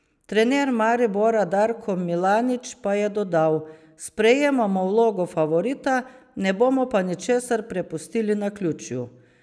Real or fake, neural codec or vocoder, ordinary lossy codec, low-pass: real; none; none; none